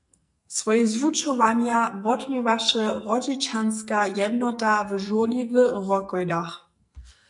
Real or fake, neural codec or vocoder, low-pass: fake; codec, 44.1 kHz, 2.6 kbps, SNAC; 10.8 kHz